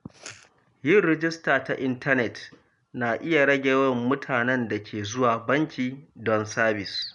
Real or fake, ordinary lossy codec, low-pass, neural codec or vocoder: real; none; 10.8 kHz; none